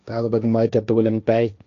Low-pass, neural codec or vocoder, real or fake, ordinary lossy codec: 7.2 kHz; codec, 16 kHz, 1.1 kbps, Voila-Tokenizer; fake; MP3, 96 kbps